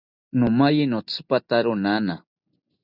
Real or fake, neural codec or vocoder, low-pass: real; none; 5.4 kHz